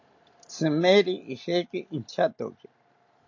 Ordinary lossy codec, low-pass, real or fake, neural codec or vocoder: AAC, 48 kbps; 7.2 kHz; real; none